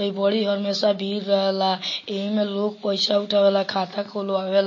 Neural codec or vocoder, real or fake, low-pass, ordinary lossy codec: none; real; 7.2 kHz; MP3, 32 kbps